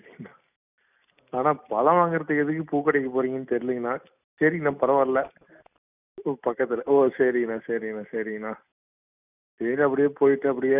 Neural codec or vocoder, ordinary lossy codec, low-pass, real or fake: none; none; 3.6 kHz; real